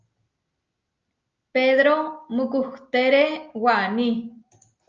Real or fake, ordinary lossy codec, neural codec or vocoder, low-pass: real; Opus, 24 kbps; none; 7.2 kHz